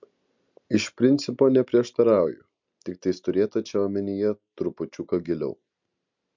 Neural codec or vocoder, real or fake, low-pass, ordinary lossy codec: none; real; 7.2 kHz; MP3, 64 kbps